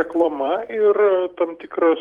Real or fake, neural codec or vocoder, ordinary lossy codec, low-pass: fake; vocoder, 44.1 kHz, 128 mel bands every 256 samples, BigVGAN v2; Opus, 24 kbps; 19.8 kHz